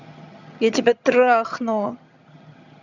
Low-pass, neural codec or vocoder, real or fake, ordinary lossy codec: 7.2 kHz; vocoder, 22.05 kHz, 80 mel bands, HiFi-GAN; fake; none